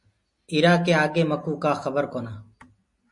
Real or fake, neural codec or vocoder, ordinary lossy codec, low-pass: real; none; MP3, 48 kbps; 10.8 kHz